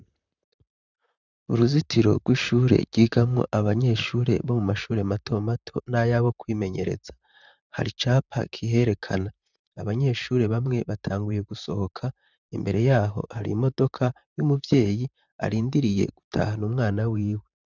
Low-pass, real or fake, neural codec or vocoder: 7.2 kHz; fake; vocoder, 44.1 kHz, 128 mel bands, Pupu-Vocoder